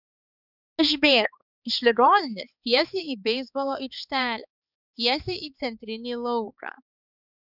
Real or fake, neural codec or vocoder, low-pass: fake; codec, 16 kHz, 4 kbps, X-Codec, HuBERT features, trained on balanced general audio; 5.4 kHz